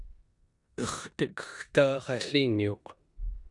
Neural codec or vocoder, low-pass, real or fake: codec, 16 kHz in and 24 kHz out, 0.9 kbps, LongCat-Audio-Codec, four codebook decoder; 10.8 kHz; fake